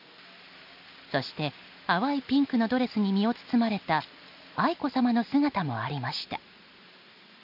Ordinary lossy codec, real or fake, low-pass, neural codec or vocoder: none; real; 5.4 kHz; none